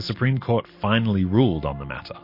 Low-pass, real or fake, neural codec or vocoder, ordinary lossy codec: 5.4 kHz; real; none; MP3, 32 kbps